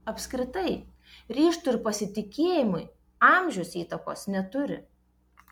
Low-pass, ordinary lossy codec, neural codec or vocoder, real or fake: 19.8 kHz; MP3, 96 kbps; none; real